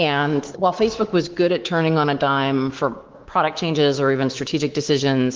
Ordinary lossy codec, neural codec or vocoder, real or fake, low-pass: Opus, 16 kbps; codec, 24 kHz, 3.1 kbps, DualCodec; fake; 7.2 kHz